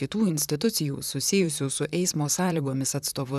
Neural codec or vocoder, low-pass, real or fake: vocoder, 44.1 kHz, 128 mel bands, Pupu-Vocoder; 14.4 kHz; fake